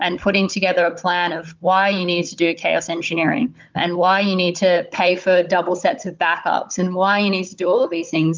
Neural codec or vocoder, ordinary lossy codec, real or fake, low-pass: codec, 16 kHz, 16 kbps, FunCodec, trained on Chinese and English, 50 frames a second; Opus, 32 kbps; fake; 7.2 kHz